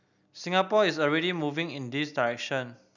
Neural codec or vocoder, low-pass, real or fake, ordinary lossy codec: none; 7.2 kHz; real; none